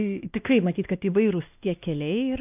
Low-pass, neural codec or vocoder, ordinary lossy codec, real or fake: 3.6 kHz; codec, 16 kHz, 1 kbps, X-Codec, WavLM features, trained on Multilingual LibriSpeech; AAC, 32 kbps; fake